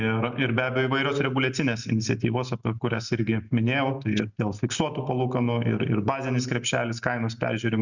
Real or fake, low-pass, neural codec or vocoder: real; 7.2 kHz; none